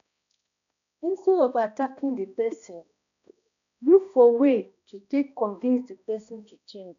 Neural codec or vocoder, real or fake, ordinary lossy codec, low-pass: codec, 16 kHz, 1 kbps, X-Codec, HuBERT features, trained on balanced general audio; fake; none; 7.2 kHz